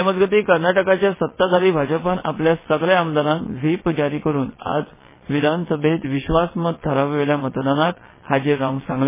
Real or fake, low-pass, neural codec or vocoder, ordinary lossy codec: fake; 3.6 kHz; vocoder, 22.05 kHz, 80 mel bands, WaveNeXt; MP3, 16 kbps